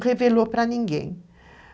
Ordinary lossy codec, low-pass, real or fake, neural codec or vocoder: none; none; real; none